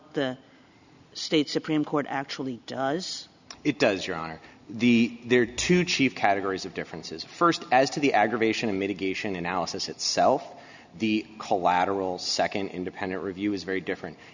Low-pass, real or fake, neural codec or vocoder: 7.2 kHz; real; none